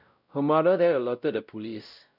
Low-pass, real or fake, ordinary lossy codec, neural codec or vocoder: 5.4 kHz; fake; none; codec, 16 kHz, 0.5 kbps, X-Codec, WavLM features, trained on Multilingual LibriSpeech